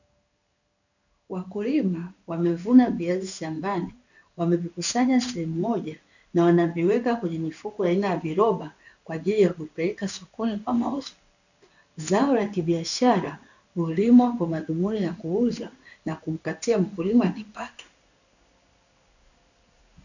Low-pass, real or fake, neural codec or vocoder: 7.2 kHz; fake; codec, 16 kHz in and 24 kHz out, 1 kbps, XY-Tokenizer